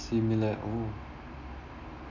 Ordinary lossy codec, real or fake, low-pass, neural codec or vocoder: none; real; 7.2 kHz; none